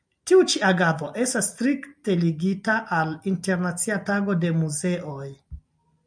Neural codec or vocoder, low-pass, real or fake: none; 9.9 kHz; real